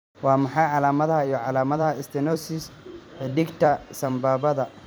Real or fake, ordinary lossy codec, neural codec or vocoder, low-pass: fake; none; vocoder, 44.1 kHz, 128 mel bands every 256 samples, BigVGAN v2; none